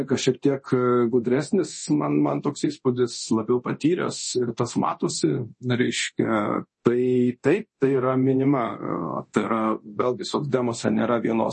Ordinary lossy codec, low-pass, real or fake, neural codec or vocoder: MP3, 32 kbps; 10.8 kHz; fake; codec, 24 kHz, 0.9 kbps, DualCodec